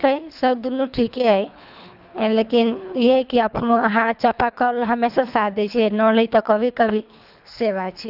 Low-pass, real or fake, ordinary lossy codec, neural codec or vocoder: 5.4 kHz; fake; none; codec, 24 kHz, 3 kbps, HILCodec